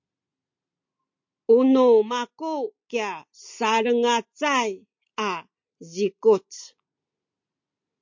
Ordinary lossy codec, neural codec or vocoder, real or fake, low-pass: MP3, 48 kbps; none; real; 7.2 kHz